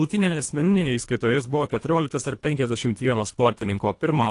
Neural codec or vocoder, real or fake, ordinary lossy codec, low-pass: codec, 24 kHz, 1.5 kbps, HILCodec; fake; AAC, 48 kbps; 10.8 kHz